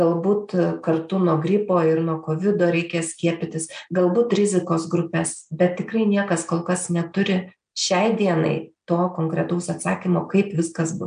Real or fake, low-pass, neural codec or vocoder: real; 9.9 kHz; none